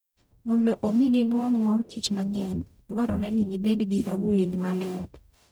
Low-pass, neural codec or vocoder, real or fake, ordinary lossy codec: none; codec, 44.1 kHz, 0.9 kbps, DAC; fake; none